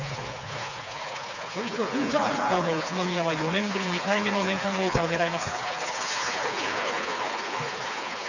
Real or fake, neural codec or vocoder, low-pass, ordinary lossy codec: fake; codec, 16 kHz, 4 kbps, FreqCodec, smaller model; 7.2 kHz; none